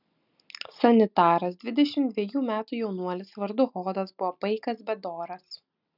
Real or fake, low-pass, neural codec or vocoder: real; 5.4 kHz; none